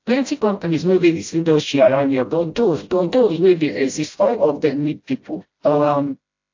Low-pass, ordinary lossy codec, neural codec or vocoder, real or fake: 7.2 kHz; AAC, 48 kbps; codec, 16 kHz, 0.5 kbps, FreqCodec, smaller model; fake